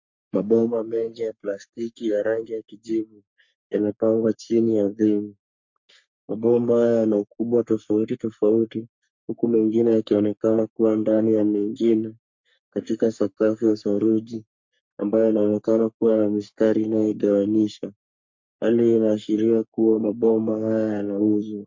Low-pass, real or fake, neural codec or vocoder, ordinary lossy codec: 7.2 kHz; fake; codec, 44.1 kHz, 3.4 kbps, Pupu-Codec; MP3, 48 kbps